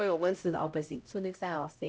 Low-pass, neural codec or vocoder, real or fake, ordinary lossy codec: none; codec, 16 kHz, 0.5 kbps, X-Codec, HuBERT features, trained on LibriSpeech; fake; none